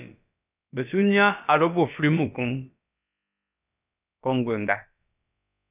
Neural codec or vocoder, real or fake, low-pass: codec, 16 kHz, about 1 kbps, DyCAST, with the encoder's durations; fake; 3.6 kHz